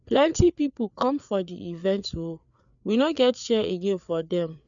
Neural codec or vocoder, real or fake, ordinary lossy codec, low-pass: codec, 16 kHz, 4 kbps, FreqCodec, larger model; fake; none; 7.2 kHz